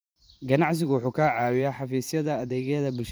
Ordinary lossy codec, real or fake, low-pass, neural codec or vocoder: none; fake; none; vocoder, 44.1 kHz, 128 mel bands every 512 samples, BigVGAN v2